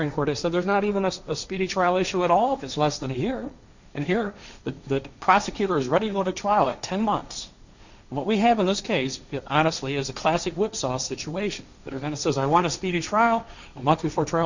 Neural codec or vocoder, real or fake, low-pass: codec, 16 kHz, 1.1 kbps, Voila-Tokenizer; fake; 7.2 kHz